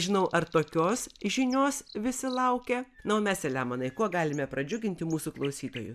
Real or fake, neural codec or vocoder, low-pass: real; none; 14.4 kHz